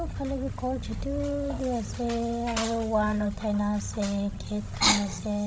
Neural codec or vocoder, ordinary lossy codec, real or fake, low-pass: codec, 16 kHz, 16 kbps, FreqCodec, larger model; none; fake; none